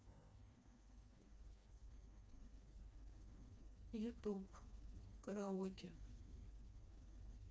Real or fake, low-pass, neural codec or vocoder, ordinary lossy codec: fake; none; codec, 16 kHz, 2 kbps, FreqCodec, smaller model; none